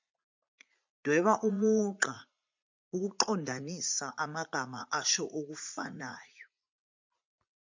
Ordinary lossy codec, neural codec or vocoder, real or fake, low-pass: MP3, 64 kbps; vocoder, 44.1 kHz, 80 mel bands, Vocos; fake; 7.2 kHz